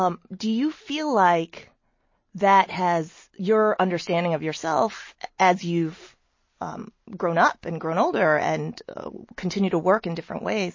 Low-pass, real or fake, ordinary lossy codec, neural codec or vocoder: 7.2 kHz; real; MP3, 32 kbps; none